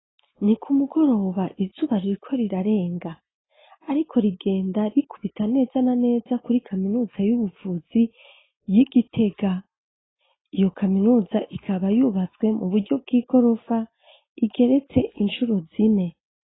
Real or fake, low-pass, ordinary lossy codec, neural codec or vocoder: real; 7.2 kHz; AAC, 16 kbps; none